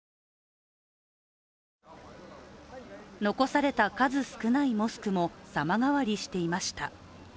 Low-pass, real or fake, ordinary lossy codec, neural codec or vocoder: none; real; none; none